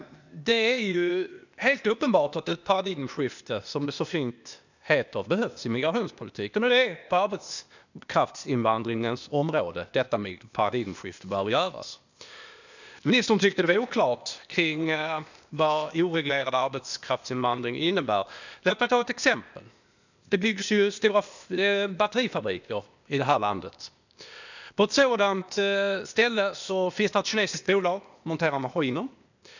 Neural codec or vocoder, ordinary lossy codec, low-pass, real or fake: codec, 16 kHz, 0.8 kbps, ZipCodec; none; 7.2 kHz; fake